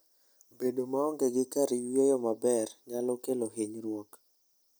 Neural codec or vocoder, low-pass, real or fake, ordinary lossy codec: none; none; real; none